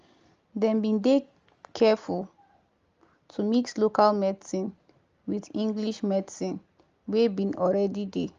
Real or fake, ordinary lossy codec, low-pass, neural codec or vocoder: real; Opus, 32 kbps; 7.2 kHz; none